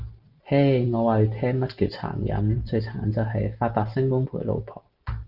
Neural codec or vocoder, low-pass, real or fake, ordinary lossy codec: none; 5.4 kHz; real; Opus, 32 kbps